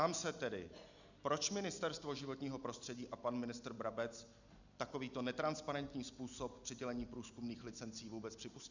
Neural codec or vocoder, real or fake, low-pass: none; real; 7.2 kHz